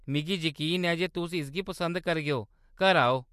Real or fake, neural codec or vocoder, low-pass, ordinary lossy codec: real; none; 14.4 kHz; MP3, 64 kbps